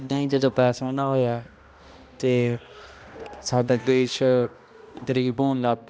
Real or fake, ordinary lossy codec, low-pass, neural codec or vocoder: fake; none; none; codec, 16 kHz, 1 kbps, X-Codec, HuBERT features, trained on balanced general audio